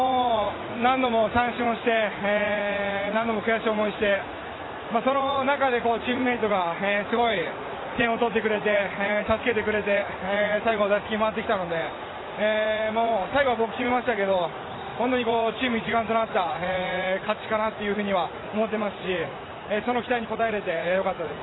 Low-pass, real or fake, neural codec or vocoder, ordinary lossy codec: 7.2 kHz; fake; vocoder, 44.1 kHz, 80 mel bands, Vocos; AAC, 16 kbps